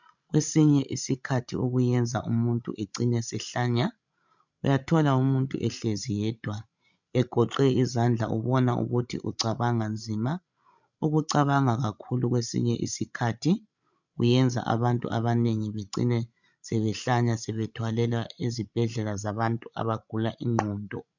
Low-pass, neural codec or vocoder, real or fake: 7.2 kHz; codec, 16 kHz, 16 kbps, FreqCodec, larger model; fake